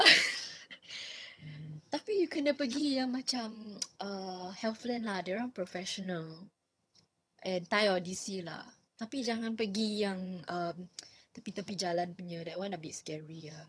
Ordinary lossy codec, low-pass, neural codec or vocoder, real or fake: none; none; vocoder, 22.05 kHz, 80 mel bands, HiFi-GAN; fake